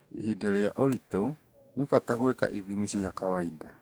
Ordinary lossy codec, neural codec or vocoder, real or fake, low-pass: none; codec, 44.1 kHz, 2.6 kbps, DAC; fake; none